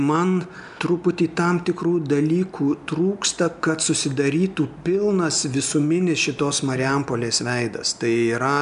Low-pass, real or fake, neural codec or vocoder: 10.8 kHz; real; none